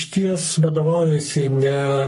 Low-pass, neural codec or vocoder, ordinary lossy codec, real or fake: 14.4 kHz; codec, 44.1 kHz, 3.4 kbps, Pupu-Codec; MP3, 48 kbps; fake